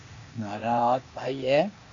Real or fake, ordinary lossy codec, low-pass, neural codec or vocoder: fake; AAC, 64 kbps; 7.2 kHz; codec, 16 kHz, 0.8 kbps, ZipCodec